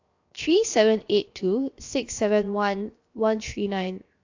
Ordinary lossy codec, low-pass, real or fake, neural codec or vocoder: AAC, 48 kbps; 7.2 kHz; fake; codec, 16 kHz, 0.7 kbps, FocalCodec